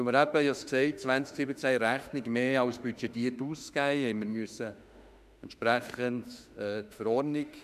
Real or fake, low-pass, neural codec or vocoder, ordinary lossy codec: fake; 14.4 kHz; autoencoder, 48 kHz, 32 numbers a frame, DAC-VAE, trained on Japanese speech; none